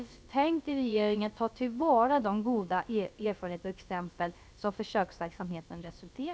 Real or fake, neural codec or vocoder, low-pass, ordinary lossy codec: fake; codec, 16 kHz, about 1 kbps, DyCAST, with the encoder's durations; none; none